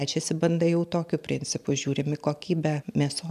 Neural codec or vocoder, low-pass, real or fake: none; 14.4 kHz; real